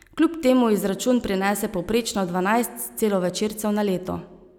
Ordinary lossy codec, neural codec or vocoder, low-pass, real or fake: none; none; 19.8 kHz; real